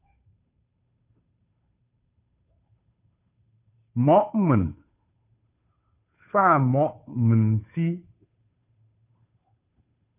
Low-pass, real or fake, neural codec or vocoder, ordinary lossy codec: 3.6 kHz; fake; codec, 16 kHz, 2 kbps, FunCodec, trained on Chinese and English, 25 frames a second; MP3, 32 kbps